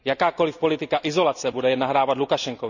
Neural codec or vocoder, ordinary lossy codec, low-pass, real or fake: none; none; 7.2 kHz; real